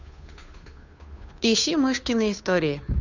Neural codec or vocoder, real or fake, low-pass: codec, 16 kHz, 2 kbps, FunCodec, trained on Chinese and English, 25 frames a second; fake; 7.2 kHz